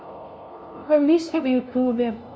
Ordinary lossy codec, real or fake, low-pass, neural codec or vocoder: none; fake; none; codec, 16 kHz, 0.5 kbps, FunCodec, trained on LibriTTS, 25 frames a second